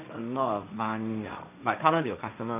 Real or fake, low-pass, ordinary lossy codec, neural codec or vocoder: fake; 3.6 kHz; none; codec, 16 kHz, 1.1 kbps, Voila-Tokenizer